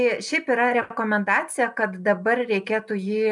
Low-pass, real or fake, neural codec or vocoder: 10.8 kHz; real; none